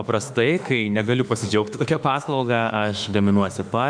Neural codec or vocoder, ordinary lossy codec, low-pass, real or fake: autoencoder, 48 kHz, 32 numbers a frame, DAC-VAE, trained on Japanese speech; MP3, 96 kbps; 9.9 kHz; fake